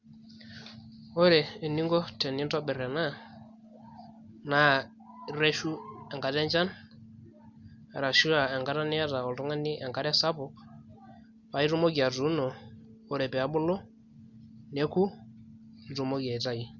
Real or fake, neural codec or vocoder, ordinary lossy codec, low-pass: real; none; Opus, 64 kbps; 7.2 kHz